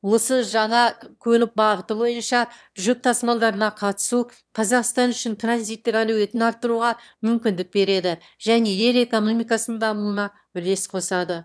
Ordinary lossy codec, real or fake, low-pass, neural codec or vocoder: none; fake; none; autoencoder, 22.05 kHz, a latent of 192 numbers a frame, VITS, trained on one speaker